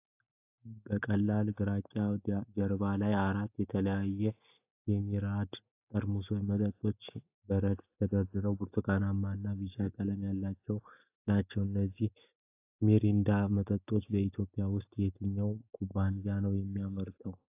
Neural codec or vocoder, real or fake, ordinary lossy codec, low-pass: none; real; AAC, 32 kbps; 3.6 kHz